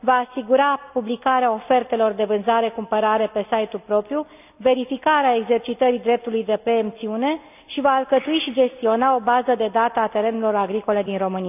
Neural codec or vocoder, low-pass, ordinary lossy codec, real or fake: none; 3.6 kHz; none; real